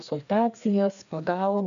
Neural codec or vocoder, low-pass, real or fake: codec, 16 kHz, 2 kbps, FreqCodec, larger model; 7.2 kHz; fake